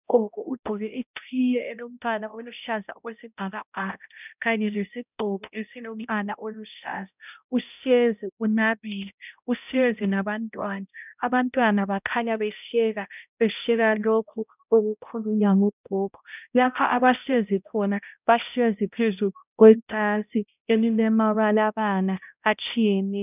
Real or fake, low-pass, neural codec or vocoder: fake; 3.6 kHz; codec, 16 kHz, 0.5 kbps, X-Codec, HuBERT features, trained on balanced general audio